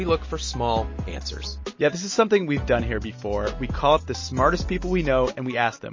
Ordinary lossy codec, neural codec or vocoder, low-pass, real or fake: MP3, 32 kbps; none; 7.2 kHz; real